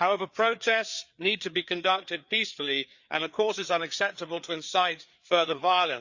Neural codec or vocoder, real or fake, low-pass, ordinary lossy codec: codec, 16 kHz, 4 kbps, FreqCodec, larger model; fake; 7.2 kHz; Opus, 64 kbps